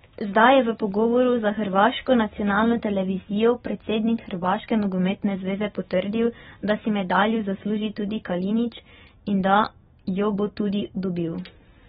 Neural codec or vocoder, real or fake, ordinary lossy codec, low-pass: none; real; AAC, 16 kbps; 19.8 kHz